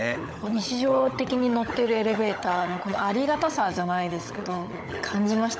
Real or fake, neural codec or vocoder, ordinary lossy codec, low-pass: fake; codec, 16 kHz, 16 kbps, FunCodec, trained on LibriTTS, 50 frames a second; none; none